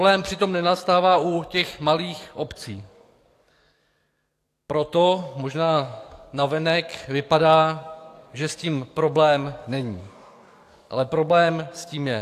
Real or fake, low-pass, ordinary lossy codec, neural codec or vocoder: fake; 14.4 kHz; AAC, 64 kbps; codec, 44.1 kHz, 7.8 kbps, DAC